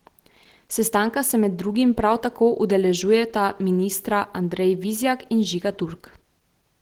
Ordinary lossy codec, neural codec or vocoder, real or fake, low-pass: Opus, 16 kbps; none; real; 19.8 kHz